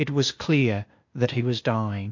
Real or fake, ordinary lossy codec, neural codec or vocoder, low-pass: fake; MP3, 48 kbps; codec, 16 kHz, 0.8 kbps, ZipCodec; 7.2 kHz